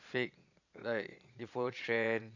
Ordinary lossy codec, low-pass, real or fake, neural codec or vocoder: none; 7.2 kHz; fake; vocoder, 22.05 kHz, 80 mel bands, Vocos